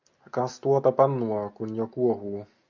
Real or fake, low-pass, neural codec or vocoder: real; 7.2 kHz; none